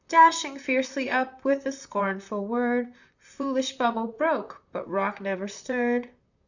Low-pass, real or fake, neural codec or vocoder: 7.2 kHz; fake; vocoder, 44.1 kHz, 128 mel bands, Pupu-Vocoder